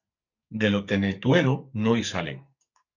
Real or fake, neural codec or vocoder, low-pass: fake; codec, 44.1 kHz, 2.6 kbps, SNAC; 7.2 kHz